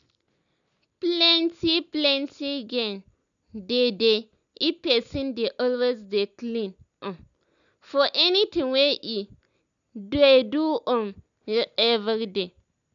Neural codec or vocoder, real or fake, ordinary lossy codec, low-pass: none; real; none; 7.2 kHz